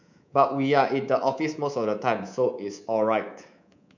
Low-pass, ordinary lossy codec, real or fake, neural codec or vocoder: 7.2 kHz; none; fake; codec, 24 kHz, 3.1 kbps, DualCodec